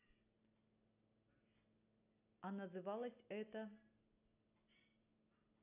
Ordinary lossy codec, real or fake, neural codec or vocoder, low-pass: none; real; none; 3.6 kHz